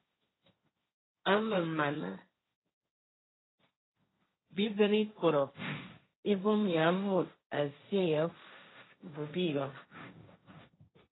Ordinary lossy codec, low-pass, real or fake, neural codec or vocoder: AAC, 16 kbps; 7.2 kHz; fake; codec, 16 kHz, 1.1 kbps, Voila-Tokenizer